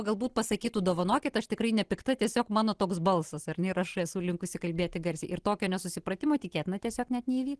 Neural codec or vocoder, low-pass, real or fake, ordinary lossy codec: none; 10.8 kHz; real; Opus, 16 kbps